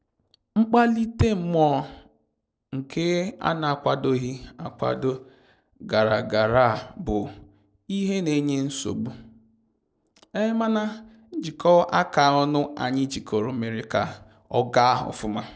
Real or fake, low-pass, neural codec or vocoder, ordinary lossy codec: real; none; none; none